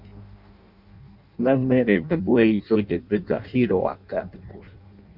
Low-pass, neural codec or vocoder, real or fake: 5.4 kHz; codec, 16 kHz in and 24 kHz out, 0.6 kbps, FireRedTTS-2 codec; fake